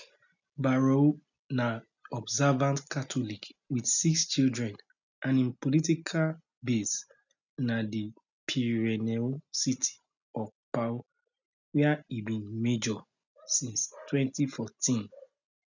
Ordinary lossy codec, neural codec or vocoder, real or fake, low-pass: none; none; real; 7.2 kHz